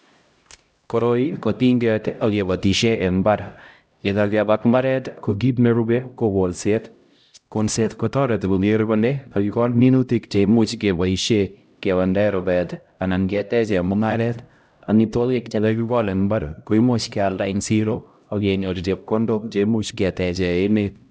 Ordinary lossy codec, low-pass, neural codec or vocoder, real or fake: none; none; codec, 16 kHz, 0.5 kbps, X-Codec, HuBERT features, trained on LibriSpeech; fake